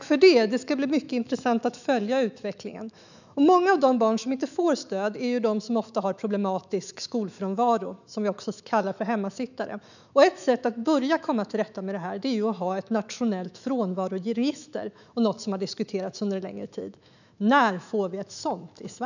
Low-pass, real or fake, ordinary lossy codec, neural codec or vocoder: 7.2 kHz; fake; none; autoencoder, 48 kHz, 128 numbers a frame, DAC-VAE, trained on Japanese speech